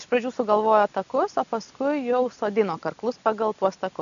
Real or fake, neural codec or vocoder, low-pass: real; none; 7.2 kHz